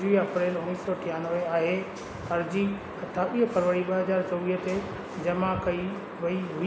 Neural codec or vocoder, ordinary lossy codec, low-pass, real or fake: none; none; none; real